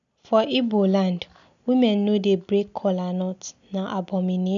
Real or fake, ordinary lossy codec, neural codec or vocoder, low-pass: real; none; none; 7.2 kHz